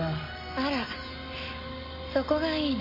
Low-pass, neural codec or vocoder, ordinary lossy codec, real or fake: 5.4 kHz; none; MP3, 24 kbps; real